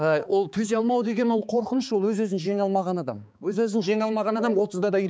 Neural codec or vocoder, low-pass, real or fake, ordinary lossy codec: codec, 16 kHz, 4 kbps, X-Codec, HuBERT features, trained on balanced general audio; none; fake; none